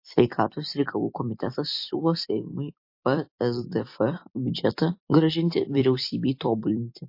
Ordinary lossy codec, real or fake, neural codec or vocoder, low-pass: MP3, 32 kbps; fake; vocoder, 44.1 kHz, 128 mel bands every 256 samples, BigVGAN v2; 5.4 kHz